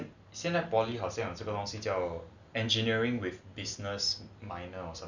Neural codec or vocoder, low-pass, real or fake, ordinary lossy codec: none; 7.2 kHz; real; none